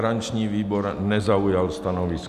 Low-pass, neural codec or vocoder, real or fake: 14.4 kHz; none; real